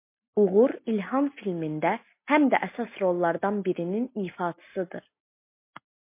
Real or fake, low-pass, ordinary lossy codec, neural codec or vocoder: real; 3.6 kHz; MP3, 24 kbps; none